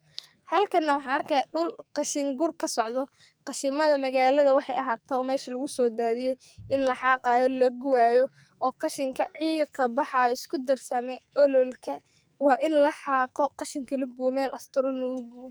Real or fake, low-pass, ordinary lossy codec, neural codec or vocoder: fake; none; none; codec, 44.1 kHz, 2.6 kbps, SNAC